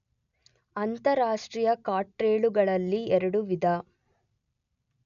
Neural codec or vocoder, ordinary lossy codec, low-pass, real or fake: none; none; 7.2 kHz; real